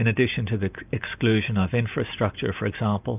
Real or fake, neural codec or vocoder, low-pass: real; none; 3.6 kHz